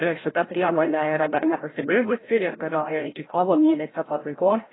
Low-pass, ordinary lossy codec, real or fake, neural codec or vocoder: 7.2 kHz; AAC, 16 kbps; fake; codec, 16 kHz, 0.5 kbps, FreqCodec, larger model